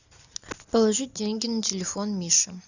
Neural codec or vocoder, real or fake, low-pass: none; real; 7.2 kHz